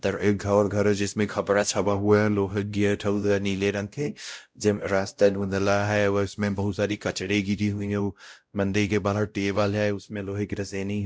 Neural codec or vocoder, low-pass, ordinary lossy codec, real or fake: codec, 16 kHz, 0.5 kbps, X-Codec, WavLM features, trained on Multilingual LibriSpeech; none; none; fake